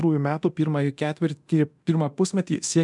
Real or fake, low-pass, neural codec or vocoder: fake; 10.8 kHz; codec, 24 kHz, 0.9 kbps, DualCodec